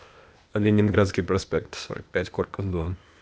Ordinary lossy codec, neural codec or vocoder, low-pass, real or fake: none; codec, 16 kHz, 0.8 kbps, ZipCodec; none; fake